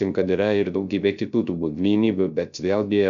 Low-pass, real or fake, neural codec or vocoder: 7.2 kHz; fake; codec, 16 kHz, 0.3 kbps, FocalCodec